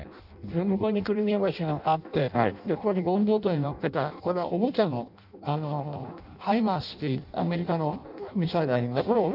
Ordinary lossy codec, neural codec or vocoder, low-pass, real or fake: none; codec, 16 kHz in and 24 kHz out, 0.6 kbps, FireRedTTS-2 codec; 5.4 kHz; fake